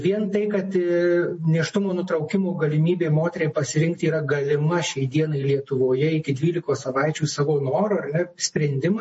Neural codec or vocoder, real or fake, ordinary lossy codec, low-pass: none; real; MP3, 32 kbps; 10.8 kHz